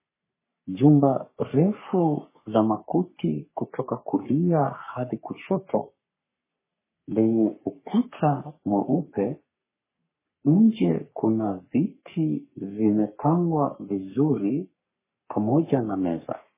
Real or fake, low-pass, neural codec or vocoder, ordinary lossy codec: fake; 3.6 kHz; codec, 44.1 kHz, 3.4 kbps, Pupu-Codec; MP3, 16 kbps